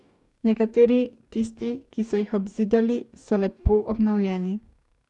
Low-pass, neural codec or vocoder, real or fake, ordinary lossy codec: 10.8 kHz; codec, 44.1 kHz, 2.6 kbps, DAC; fake; none